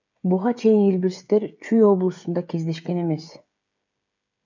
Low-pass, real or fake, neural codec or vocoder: 7.2 kHz; fake; codec, 16 kHz, 16 kbps, FreqCodec, smaller model